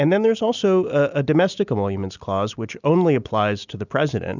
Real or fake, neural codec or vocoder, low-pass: real; none; 7.2 kHz